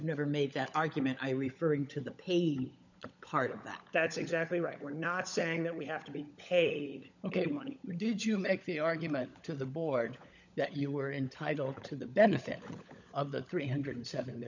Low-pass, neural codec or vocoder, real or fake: 7.2 kHz; codec, 16 kHz, 16 kbps, FunCodec, trained on LibriTTS, 50 frames a second; fake